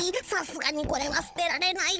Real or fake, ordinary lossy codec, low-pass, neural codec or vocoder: fake; none; none; codec, 16 kHz, 16 kbps, FunCodec, trained on Chinese and English, 50 frames a second